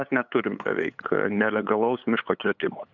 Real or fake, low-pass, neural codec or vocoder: fake; 7.2 kHz; codec, 16 kHz, 8 kbps, FunCodec, trained on LibriTTS, 25 frames a second